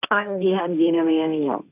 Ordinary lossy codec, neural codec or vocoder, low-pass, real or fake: none; codec, 16 kHz, 1.1 kbps, Voila-Tokenizer; 3.6 kHz; fake